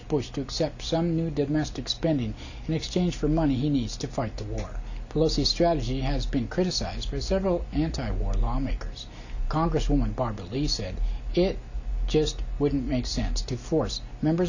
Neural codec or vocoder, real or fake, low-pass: none; real; 7.2 kHz